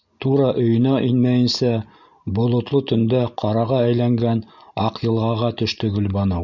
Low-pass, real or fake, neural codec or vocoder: 7.2 kHz; real; none